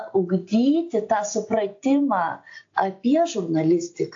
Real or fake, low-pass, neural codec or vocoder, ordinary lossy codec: real; 7.2 kHz; none; AAC, 48 kbps